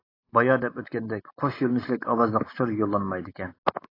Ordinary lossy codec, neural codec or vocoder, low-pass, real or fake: AAC, 24 kbps; none; 5.4 kHz; real